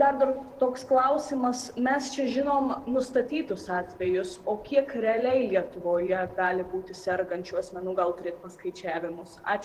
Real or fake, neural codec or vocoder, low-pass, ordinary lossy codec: fake; vocoder, 48 kHz, 128 mel bands, Vocos; 14.4 kHz; Opus, 16 kbps